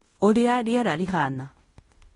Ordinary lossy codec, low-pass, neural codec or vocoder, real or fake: AAC, 32 kbps; 10.8 kHz; codec, 16 kHz in and 24 kHz out, 0.9 kbps, LongCat-Audio-Codec, fine tuned four codebook decoder; fake